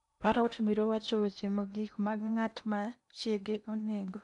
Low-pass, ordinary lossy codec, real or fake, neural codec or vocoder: 10.8 kHz; none; fake; codec, 16 kHz in and 24 kHz out, 0.8 kbps, FocalCodec, streaming, 65536 codes